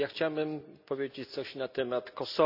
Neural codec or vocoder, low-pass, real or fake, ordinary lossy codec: none; 5.4 kHz; real; none